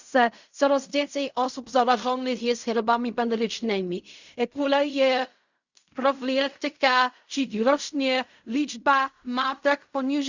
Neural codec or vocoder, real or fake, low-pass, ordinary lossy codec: codec, 16 kHz in and 24 kHz out, 0.4 kbps, LongCat-Audio-Codec, fine tuned four codebook decoder; fake; 7.2 kHz; Opus, 64 kbps